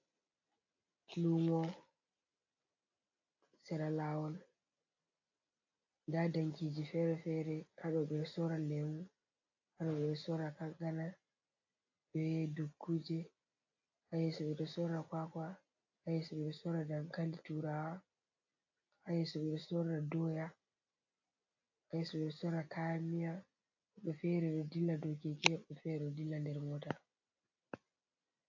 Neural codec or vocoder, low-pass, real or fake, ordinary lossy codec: none; 7.2 kHz; real; AAC, 48 kbps